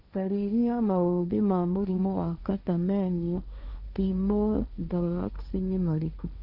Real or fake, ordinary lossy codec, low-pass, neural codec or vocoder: fake; none; 5.4 kHz; codec, 16 kHz, 1.1 kbps, Voila-Tokenizer